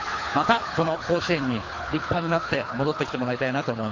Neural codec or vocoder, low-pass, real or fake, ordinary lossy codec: codec, 24 kHz, 3 kbps, HILCodec; 7.2 kHz; fake; AAC, 32 kbps